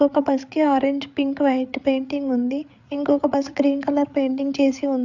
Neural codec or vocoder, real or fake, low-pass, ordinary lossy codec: codec, 16 kHz, 8 kbps, FreqCodec, larger model; fake; 7.2 kHz; none